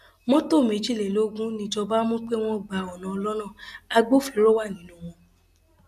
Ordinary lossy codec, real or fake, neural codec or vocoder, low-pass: none; real; none; 14.4 kHz